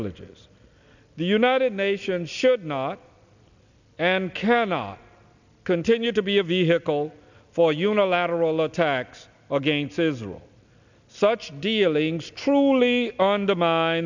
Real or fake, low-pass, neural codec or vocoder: real; 7.2 kHz; none